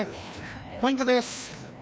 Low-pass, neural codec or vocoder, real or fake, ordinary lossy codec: none; codec, 16 kHz, 1 kbps, FreqCodec, larger model; fake; none